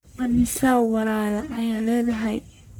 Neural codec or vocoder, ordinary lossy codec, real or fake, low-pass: codec, 44.1 kHz, 1.7 kbps, Pupu-Codec; none; fake; none